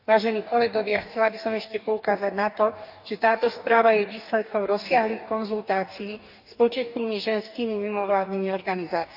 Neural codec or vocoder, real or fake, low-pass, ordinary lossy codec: codec, 44.1 kHz, 2.6 kbps, DAC; fake; 5.4 kHz; none